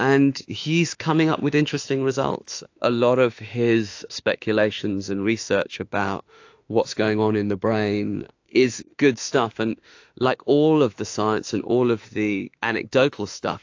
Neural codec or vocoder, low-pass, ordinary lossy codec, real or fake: autoencoder, 48 kHz, 32 numbers a frame, DAC-VAE, trained on Japanese speech; 7.2 kHz; AAC, 48 kbps; fake